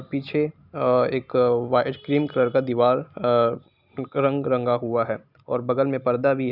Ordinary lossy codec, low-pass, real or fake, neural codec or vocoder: none; 5.4 kHz; real; none